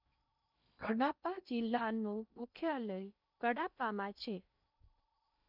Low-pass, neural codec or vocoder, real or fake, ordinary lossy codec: 5.4 kHz; codec, 16 kHz in and 24 kHz out, 0.6 kbps, FocalCodec, streaming, 2048 codes; fake; none